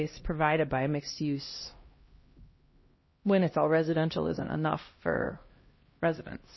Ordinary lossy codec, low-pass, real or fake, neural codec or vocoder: MP3, 24 kbps; 7.2 kHz; fake; codec, 16 kHz, 1 kbps, X-Codec, HuBERT features, trained on LibriSpeech